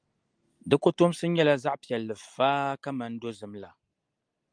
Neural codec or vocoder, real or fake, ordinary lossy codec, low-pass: none; real; Opus, 32 kbps; 9.9 kHz